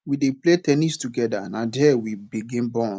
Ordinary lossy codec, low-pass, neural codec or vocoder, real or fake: none; none; none; real